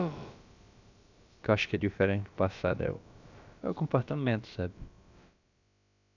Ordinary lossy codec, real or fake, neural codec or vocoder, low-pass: none; fake; codec, 16 kHz, about 1 kbps, DyCAST, with the encoder's durations; 7.2 kHz